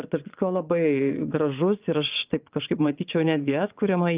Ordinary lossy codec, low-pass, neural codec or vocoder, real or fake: Opus, 24 kbps; 3.6 kHz; vocoder, 22.05 kHz, 80 mel bands, Vocos; fake